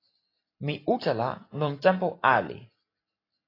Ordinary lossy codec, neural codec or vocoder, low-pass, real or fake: AAC, 24 kbps; none; 5.4 kHz; real